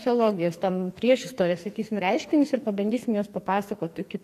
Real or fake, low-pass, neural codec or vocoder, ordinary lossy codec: fake; 14.4 kHz; codec, 44.1 kHz, 2.6 kbps, SNAC; AAC, 64 kbps